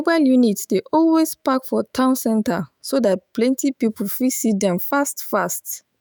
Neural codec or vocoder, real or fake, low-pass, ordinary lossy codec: autoencoder, 48 kHz, 128 numbers a frame, DAC-VAE, trained on Japanese speech; fake; none; none